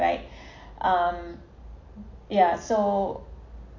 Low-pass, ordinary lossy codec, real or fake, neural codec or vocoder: 7.2 kHz; AAC, 48 kbps; real; none